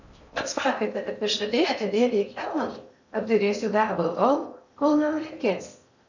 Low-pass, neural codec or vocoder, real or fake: 7.2 kHz; codec, 16 kHz in and 24 kHz out, 0.6 kbps, FocalCodec, streaming, 2048 codes; fake